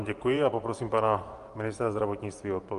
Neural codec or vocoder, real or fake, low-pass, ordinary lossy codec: vocoder, 24 kHz, 100 mel bands, Vocos; fake; 10.8 kHz; Opus, 32 kbps